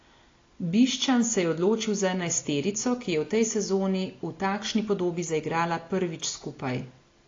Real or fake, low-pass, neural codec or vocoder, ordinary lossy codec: real; 7.2 kHz; none; AAC, 32 kbps